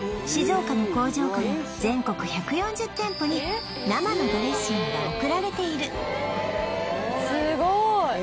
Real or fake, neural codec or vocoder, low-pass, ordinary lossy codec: real; none; none; none